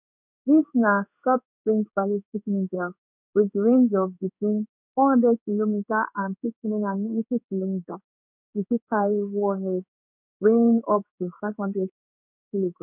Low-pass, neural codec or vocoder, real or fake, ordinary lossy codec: 3.6 kHz; codec, 16 kHz in and 24 kHz out, 1 kbps, XY-Tokenizer; fake; none